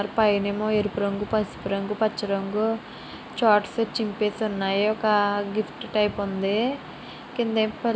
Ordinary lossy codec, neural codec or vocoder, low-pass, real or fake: none; none; none; real